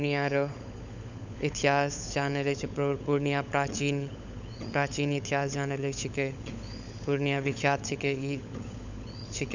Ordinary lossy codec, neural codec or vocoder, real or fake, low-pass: none; codec, 16 kHz, 16 kbps, FunCodec, trained on LibriTTS, 50 frames a second; fake; 7.2 kHz